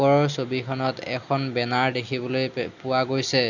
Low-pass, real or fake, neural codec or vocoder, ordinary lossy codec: 7.2 kHz; real; none; none